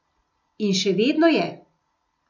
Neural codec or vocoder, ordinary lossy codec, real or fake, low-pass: none; none; real; 7.2 kHz